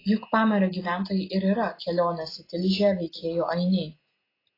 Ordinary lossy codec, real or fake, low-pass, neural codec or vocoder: AAC, 24 kbps; real; 5.4 kHz; none